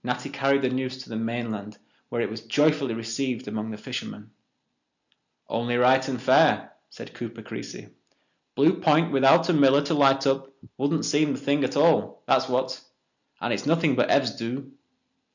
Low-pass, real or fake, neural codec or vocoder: 7.2 kHz; real; none